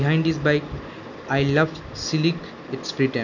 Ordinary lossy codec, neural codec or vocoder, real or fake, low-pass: none; none; real; 7.2 kHz